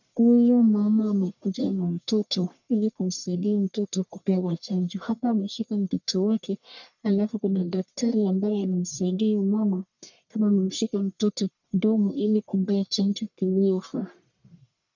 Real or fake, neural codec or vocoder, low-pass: fake; codec, 44.1 kHz, 1.7 kbps, Pupu-Codec; 7.2 kHz